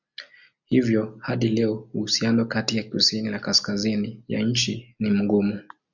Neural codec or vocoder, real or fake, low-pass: none; real; 7.2 kHz